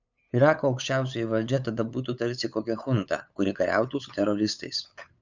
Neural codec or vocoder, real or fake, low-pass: codec, 16 kHz, 8 kbps, FunCodec, trained on LibriTTS, 25 frames a second; fake; 7.2 kHz